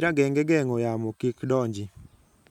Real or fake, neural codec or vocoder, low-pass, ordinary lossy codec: real; none; 19.8 kHz; none